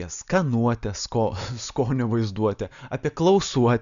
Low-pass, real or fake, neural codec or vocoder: 7.2 kHz; real; none